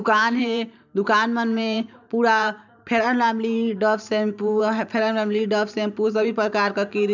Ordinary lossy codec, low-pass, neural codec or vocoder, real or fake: none; 7.2 kHz; vocoder, 44.1 kHz, 128 mel bands every 512 samples, BigVGAN v2; fake